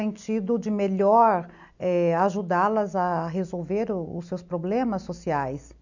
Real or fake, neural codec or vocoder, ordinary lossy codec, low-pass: real; none; none; 7.2 kHz